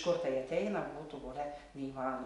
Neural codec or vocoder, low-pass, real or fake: none; 10.8 kHz; real